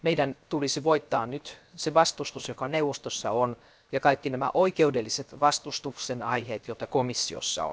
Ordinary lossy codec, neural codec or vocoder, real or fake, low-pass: none; codec, 16 kHz, 0.7 kbps, FocalCodec; fake; none